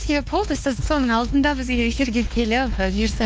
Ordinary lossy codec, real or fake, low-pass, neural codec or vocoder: none; fake; none; codec, 16 kHz, 2 kbps, X-Codec, WavLM features, trained on Multilingual LibriSpeech